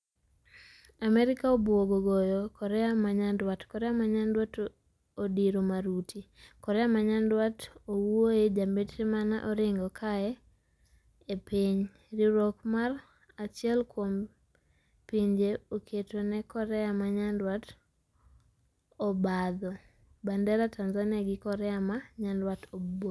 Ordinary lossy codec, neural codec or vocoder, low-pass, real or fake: none; none; none; real